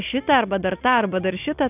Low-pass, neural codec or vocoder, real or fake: 3.6 kHz; none; real